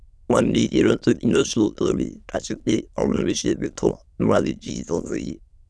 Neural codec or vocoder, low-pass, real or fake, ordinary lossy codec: autoencoder, 22.05 kHz, a latent of 192 numbers a frame, VITS, trained on many speakers; none; fake; none